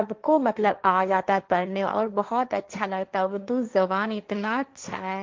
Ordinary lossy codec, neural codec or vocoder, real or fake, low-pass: Opus, 24 kbps; codec, 16 kHz, 1.1 kbps, Voila-Tokenizer; fake; 7.2 kHz